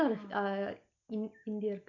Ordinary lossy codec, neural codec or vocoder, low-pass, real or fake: none; none; 7.2 kHz; real